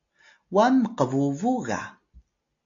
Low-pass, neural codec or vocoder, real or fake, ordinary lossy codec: 7.2 kHz; none; real; AAC, 48 kbps